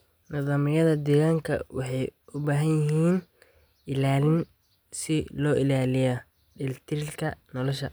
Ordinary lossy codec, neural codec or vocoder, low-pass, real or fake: none; none; none; real